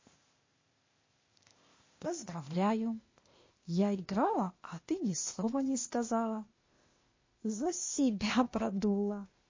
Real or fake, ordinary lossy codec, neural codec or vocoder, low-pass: fake; MP3, 32 kbps; codec, 16 kHz, 0.8 kbps, ZipCodec; 7.2 kHz